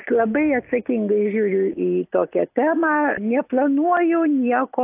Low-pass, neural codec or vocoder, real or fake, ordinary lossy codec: 3.6 kHz; none; real; AAC, 32 kbps